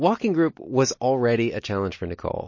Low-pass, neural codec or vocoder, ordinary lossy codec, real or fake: 7.2 kHz; none; MP3, 32 kbps; real